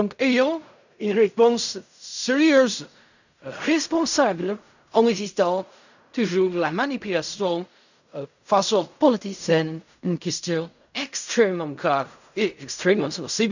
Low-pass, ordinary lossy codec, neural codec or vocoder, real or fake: 7.2 kHz; none; codec, 16 kHz in and 24 kHz out, 0.4 kbps, LongCat-Audio-Codec, fine tuned four codebook decoder; fake